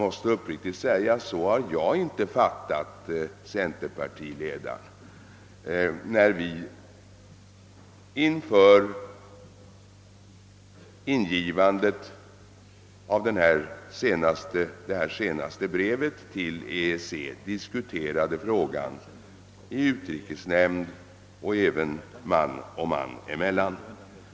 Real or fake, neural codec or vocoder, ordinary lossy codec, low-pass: real; none; none; none